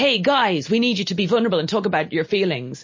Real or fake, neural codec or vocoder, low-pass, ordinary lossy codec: real; none; 7.2 kHz; MP3, 32 kbps